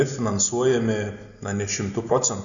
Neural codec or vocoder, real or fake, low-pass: none; real; 7.2 kHz